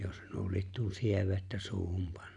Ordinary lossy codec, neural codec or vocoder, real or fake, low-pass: none; none; real; 10.8 kHz